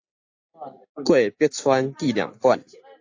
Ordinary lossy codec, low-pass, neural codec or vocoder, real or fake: AAC, 48 kbps; 7.2 kHz; none; real